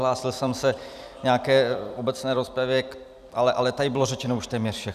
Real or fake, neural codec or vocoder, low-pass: fake; vocoder, 44.1 kHz, 128 mel bands every 256 samples, BigVGAN v2; 14.4 kHz